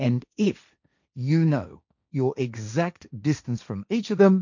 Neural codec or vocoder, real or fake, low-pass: codec, 16 kHz, 1.1 kbps, Voila-Tokenizer; fake; 7.2 kHz